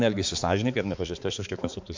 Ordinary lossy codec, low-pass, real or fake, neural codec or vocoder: MP3, 48 kbps; 7.2 kHz; fake; codec, 16 kHz, 4 kbps, X-Codec, HuBERT features, trained on balanced general audio